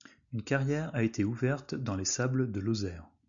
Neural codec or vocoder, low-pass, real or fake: none; 7.2 kHz; real